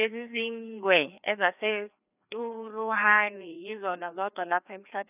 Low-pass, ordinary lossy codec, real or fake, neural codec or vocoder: 3.6 kHz; none; fake; codec, 16 kHz, 2 kbps, FreqCodec, larger model